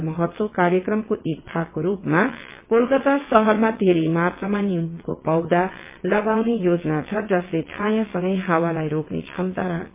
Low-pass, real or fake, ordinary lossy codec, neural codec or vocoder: 3.6 kHz; fake; MP3, 24 kbps; vocoder, 22.05 kHz, 80 mel bands, WaveNeXt